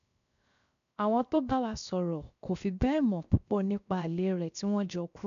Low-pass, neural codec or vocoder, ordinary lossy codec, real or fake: 7.2 kHz; codec, 16 kHz, 0.7 kbps, FocalCodec; none; fake